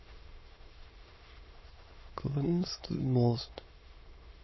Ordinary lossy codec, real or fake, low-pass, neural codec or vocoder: MP3, 24 kbps; fake; 7.2 kHz; autoencoder, 22.05 kHz, a latent of 192 numbers a frame, VITS, trained on many speakers